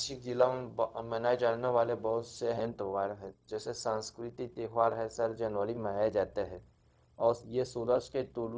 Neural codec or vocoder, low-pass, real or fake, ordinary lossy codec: codec, 16 kHz, 0.4 kbps, LongCat-Audio-Codec; none; fake; none